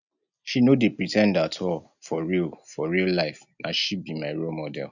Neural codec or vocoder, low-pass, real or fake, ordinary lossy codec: none; 7.2 kHz; real; none